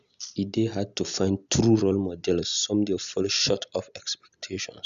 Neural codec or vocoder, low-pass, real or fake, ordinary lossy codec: none; 7.2 kHz; real; Opus, 64 kbps